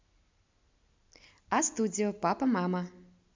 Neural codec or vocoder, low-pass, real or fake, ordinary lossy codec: none; 7.2 kHz; real; MP3, 64 kbps